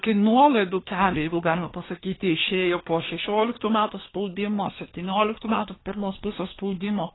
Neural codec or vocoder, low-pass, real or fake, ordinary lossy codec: codec, 24 kHz, 1 kbps, SNAC; 7.2 kHz; fake; AAC, 16 kbps